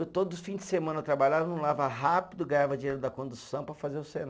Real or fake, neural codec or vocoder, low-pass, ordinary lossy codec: real; none; none; none